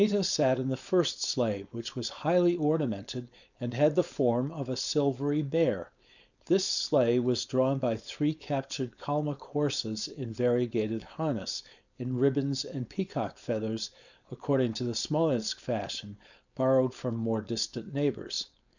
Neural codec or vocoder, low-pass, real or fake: codec, 16 kHz, 4.8 kbps, FACodec; 7.2 kHz; fake